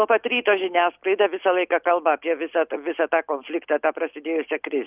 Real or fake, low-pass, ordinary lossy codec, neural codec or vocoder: real; 3.6 kHz; Opus, 24 kbps; none